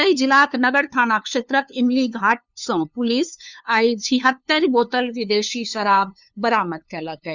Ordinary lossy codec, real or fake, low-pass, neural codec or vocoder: none; fake; 7.2 kHz; codec, 16 kHz, 2 kbps, FunCodec, trained on LibriTTS, 25 frames a second